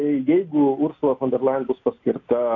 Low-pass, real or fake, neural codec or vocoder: 7.2 kHz; real; none